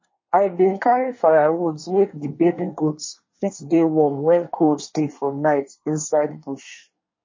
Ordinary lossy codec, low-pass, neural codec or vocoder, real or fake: MP3, 32 kbps; 7.2 kHz; codec, 24 kHz, 1 kbps, SNAC; fake